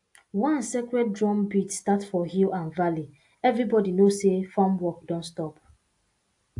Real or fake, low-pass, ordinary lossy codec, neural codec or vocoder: real; 10.8 kHz; AAC, 64 kbps; none